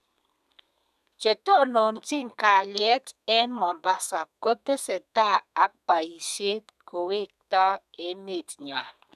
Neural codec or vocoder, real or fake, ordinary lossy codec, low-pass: codec, 32 kHz, 1.9 kbps, SNAC; fake; none; 14.4 kHz